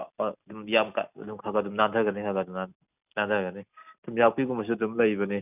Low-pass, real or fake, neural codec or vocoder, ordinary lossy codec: 3.6 kHz; real; none; none